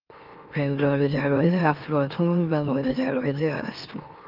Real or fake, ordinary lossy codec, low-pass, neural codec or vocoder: fake; Opus, 24 kbps; 5.4 kHz; autoencoder, 44.1 kHz, a latent of 192 numbers a frame, MeloTTS